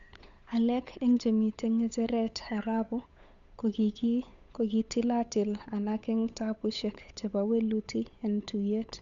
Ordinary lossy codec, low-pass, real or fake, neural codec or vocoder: none; 7.2 kHz; fake; codec, 16 kHz, 8 kbps, FunCodec, trained on Chinese and English, 25 frames a second